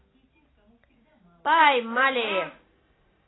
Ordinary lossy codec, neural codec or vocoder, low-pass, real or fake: AAC, 16 kbps; none; 7.2 kHz; real